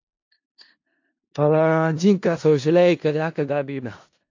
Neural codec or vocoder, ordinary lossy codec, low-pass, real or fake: codec, 16 kHz in and 24 kHz out, 0.4 kbps, LongCat-Audio-Codec, four codebook decoder; AAC, 48 kbps; 7.2 kHz; fake